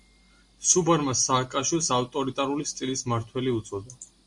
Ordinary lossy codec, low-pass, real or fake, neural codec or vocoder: MP3, 64 kbps; 10.8 kHz; real; none